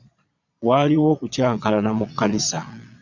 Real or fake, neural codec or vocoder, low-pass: fake; vocoder, 22.05 kHz, 80 mel bands, Vocos; 7.2 kHz